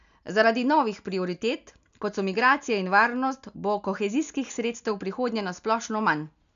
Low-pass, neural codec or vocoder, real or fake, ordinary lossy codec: 7.2 kHz; none; real; none